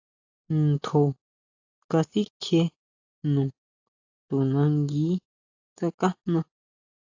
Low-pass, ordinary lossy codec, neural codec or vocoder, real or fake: 7.2 kHz; AAC, 48 kbps; none; real